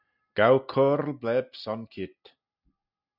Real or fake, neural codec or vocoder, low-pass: real; none; 5.4 kHz